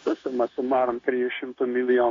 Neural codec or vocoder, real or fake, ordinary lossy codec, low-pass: codec, 16 kHz, 0.9 kbps, LongCat-Audio-Codec; fake; AAC, 32 kbps; 7.2 kHz